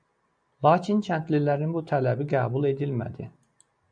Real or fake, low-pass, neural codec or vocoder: real; 9.9 kHz; none